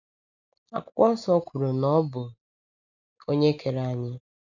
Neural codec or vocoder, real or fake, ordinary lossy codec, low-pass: none; real; none; 7.2 kHz